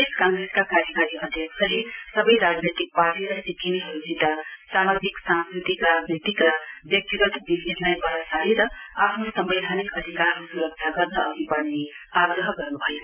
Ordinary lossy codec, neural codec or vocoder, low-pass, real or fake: none; none; 3.6 kHz; real